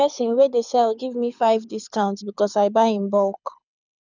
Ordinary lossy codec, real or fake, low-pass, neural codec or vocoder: none; fake; 7.2 kHz; codec, 24 kHz, 6 kbps, HILCodec